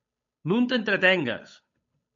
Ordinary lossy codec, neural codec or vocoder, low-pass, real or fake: MP3, 48 kbps; codec, 16 kHz, 8 kbps, FunCodec, trained on Chinese and English, 25 frames a second; 7.2 kHz; fake